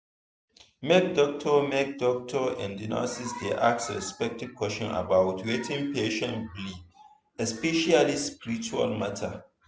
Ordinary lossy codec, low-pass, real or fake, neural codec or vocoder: none; none; real; none